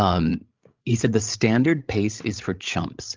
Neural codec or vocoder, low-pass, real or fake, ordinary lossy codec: codec, 16 kHz, 16 kbps, FreqCodec, larger model; 7.2 kHz; fake; Opus, 24 kbps